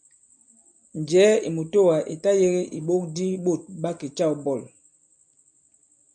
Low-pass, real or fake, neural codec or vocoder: 9.9 kHz; real; none